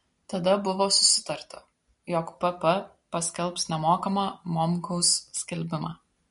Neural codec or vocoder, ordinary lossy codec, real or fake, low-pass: none; MP3, 48 kbps; real; 10.8 kHz